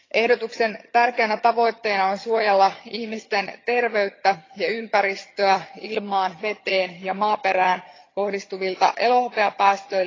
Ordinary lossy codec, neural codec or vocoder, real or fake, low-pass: AAC, 32 kbps; vocoder, 22.05 kHz, 80 mel bands, HiFi-GAN; fake; 7.2 kHz